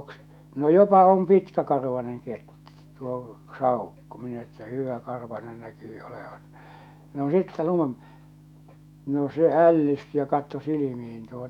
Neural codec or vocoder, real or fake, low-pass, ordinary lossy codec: autoencoder, 48 kHz, 128 numbers a frame, DAC-VAE, trained on Japanese speech; fake; 19.8 kHz; none